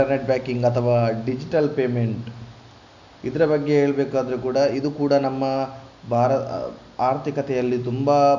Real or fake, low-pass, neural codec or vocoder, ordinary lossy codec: real; 7.2 kHz; none; none